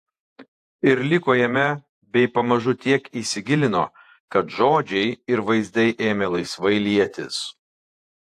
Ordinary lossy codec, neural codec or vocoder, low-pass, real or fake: AAC, 64 kbps; vocoder, 48 kHz, 128 mel bands, Vocos; 14.4 kHz; fake